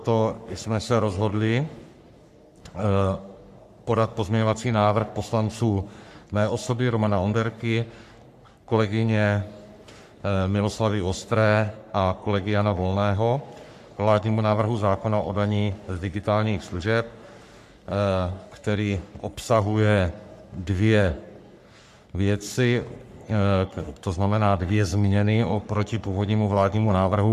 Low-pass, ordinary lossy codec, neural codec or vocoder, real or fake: 14.4 kHz; MP3, 96 kbps; codec, 44.1 kHz, 3.4 kbps, Pupu-Codec; fake